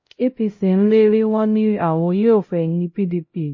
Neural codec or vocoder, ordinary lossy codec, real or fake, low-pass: codec, 16 kHz, 0.5 kbps, X-Codec, HuBERT features, trained on LibriSpeech; MP3, 32 kbps; fake; 7.2 kHz